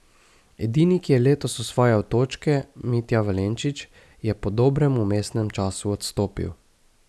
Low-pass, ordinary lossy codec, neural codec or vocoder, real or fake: none; none; none; real